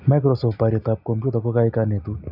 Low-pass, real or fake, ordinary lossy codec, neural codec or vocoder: 5.4 kHz; real; none; none